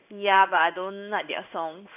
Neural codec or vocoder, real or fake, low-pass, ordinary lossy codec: codec, 16 kHz in and 24 kHz out, 1 kbps, XY-Tokenizer; fake; 3.6 kHz; none